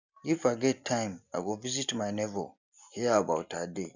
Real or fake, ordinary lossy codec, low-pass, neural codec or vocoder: real; none; 7.2 kHz; none